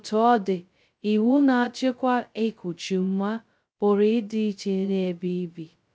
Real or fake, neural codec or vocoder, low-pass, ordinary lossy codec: fake; codec, 16 kHz, 0.2 kbps, FocalCodec; none; none